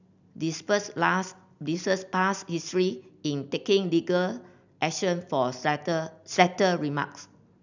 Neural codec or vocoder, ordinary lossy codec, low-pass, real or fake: none; none; 7.2 kHz; real